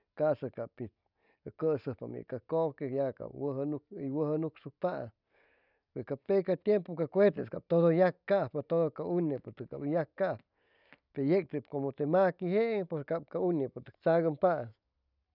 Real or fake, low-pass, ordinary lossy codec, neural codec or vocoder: real; 5.4 kHz; none; none